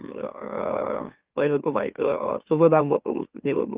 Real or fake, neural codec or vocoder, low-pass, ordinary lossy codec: fake; autoencoder, 44.1 kHz, a latent of 192 numbers a frame, MeloTTS; 3.6 kHz; Opus, 32 kbps